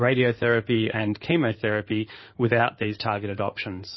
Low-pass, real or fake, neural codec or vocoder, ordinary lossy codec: 7.2 kHz; fake; codec, 16 kHz in and 24 kHz out, 2.2 kbps, FireRedTTS-2 codec; MP3, 24 kbps